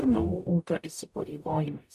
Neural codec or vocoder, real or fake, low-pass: codec, 44.1 kHz, 0.9 kbps, DAC; fake; 14.4 kHz